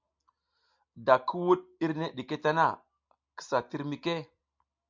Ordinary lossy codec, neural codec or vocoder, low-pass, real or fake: MP3, 64 kbps; none; 7.2 kHz; real